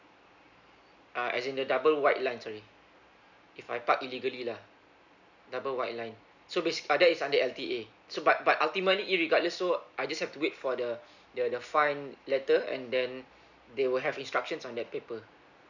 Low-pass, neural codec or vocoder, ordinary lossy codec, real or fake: 7.2 kHz; none; none; real